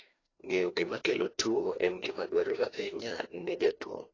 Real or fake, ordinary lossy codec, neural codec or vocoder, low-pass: fake; AAC, 32 kbps; codec, 16 kHz, 1 kbps, FreqCodec, larger model; 7.2 kHz